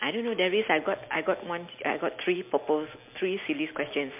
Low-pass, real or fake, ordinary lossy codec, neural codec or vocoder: 3.6 kHz; real; MP3, 32 kbps; none